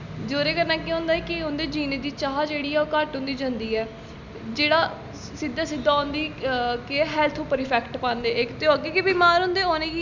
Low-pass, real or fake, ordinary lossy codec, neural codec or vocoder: 7.2 kHz; real; none; none